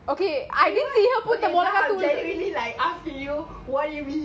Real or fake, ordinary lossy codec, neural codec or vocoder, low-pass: real; none; none; none